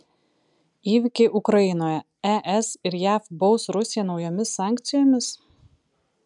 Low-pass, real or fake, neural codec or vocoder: 10.8 kHz; real; none